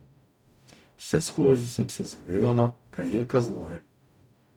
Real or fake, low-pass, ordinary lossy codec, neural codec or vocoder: fake; 19.8 kHz; none; codec, 44.1 kHz, 0.9 kbps, DAC